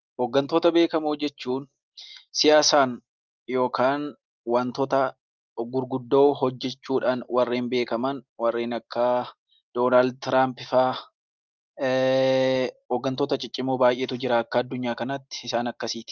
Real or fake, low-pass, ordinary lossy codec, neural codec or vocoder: real; 7.2 kHz; Opus, 32 kbps; none